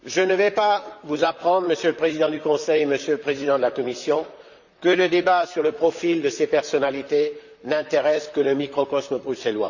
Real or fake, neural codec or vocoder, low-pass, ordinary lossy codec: fake; vocoder, 44.1 kHz, 128 mel bands, Pupu-Vocoder; 7.2 kHz; none